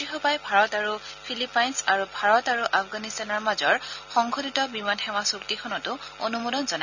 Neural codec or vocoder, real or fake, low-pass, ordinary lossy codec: none; real; 7.2 kHz; none